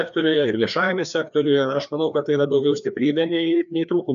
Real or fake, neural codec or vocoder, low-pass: fake; codec, 16 kHz, 2 kbps, FreqCodec, larger model; 7.2 kHz